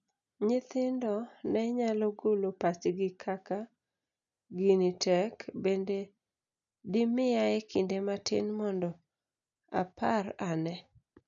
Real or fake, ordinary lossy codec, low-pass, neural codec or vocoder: real; none; 7.2 kHz; none